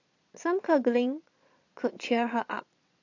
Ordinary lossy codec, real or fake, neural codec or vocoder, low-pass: AAC, 48 kbps; fake; vocoder, 22.05 kHz, 80 mel bands, Vocos; 7.2 kHz